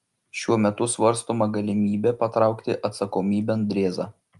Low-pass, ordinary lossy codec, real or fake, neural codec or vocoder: 10.8 kHz; Opus, 32 kbps; real; none